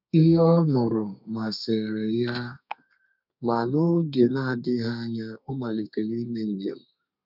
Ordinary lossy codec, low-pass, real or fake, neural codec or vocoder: none; 5.4 kHz; fake; codec, 32 kHz, 1.9 kbps, SNAC